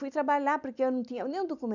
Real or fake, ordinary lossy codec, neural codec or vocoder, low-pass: real; none; none; 7.2 kHz